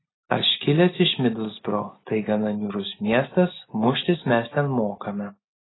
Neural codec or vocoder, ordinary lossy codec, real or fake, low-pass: none; AAC, 16 kbps; real; 7.2 kHz